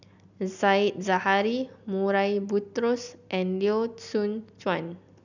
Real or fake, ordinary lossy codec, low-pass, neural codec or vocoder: real; none; 7.2 kHz; none